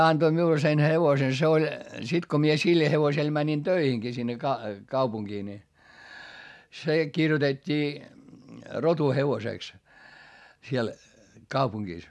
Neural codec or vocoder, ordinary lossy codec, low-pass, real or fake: none; none; none; real